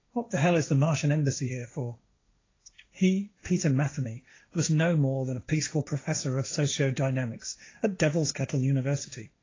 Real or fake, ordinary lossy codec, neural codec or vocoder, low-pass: fake; AAC, 32 kbps; codec, 16 kHz, 1.1 kbps, Voila-Tokenizer; 7.2 kHz